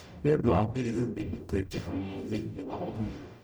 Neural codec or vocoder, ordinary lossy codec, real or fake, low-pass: codec, 44.1 kHz, 0.9 kbps, DAC; none; fake; none